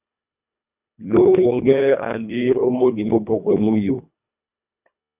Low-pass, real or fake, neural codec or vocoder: 3.6 kHz; fake; codec, 24 kHz, 1.5 kbps, HILCodec